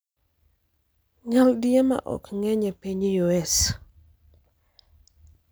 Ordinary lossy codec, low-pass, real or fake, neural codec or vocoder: none; none; real; none